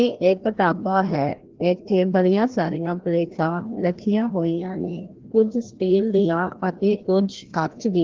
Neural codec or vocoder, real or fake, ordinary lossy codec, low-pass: codec, 16 kHz, 1 kbps, FreqCodec, larger model; fake; Opus, 16 kbps; 7.2 kHz